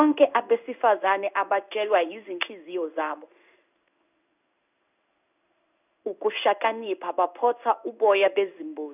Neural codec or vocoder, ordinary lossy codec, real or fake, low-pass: codec, 16 kHz in and 24 kHz out, 1 kbps, XY-Tokenizer; none; fake; 3.6 kHz